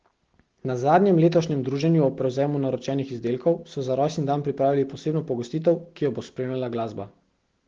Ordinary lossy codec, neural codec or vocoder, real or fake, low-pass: Opus, 16 kbps; none; real; 7.2 kHz